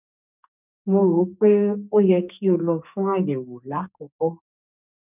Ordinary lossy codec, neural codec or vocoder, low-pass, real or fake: none; codec, 44.1 kHz, 2.6 kbps, SNAC; 3.6 kHz; fake